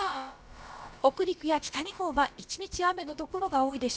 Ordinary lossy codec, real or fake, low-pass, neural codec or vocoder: none; fake; none; codec, 16 kHz, about 1 kbps, DyCAST, with the encoder's durations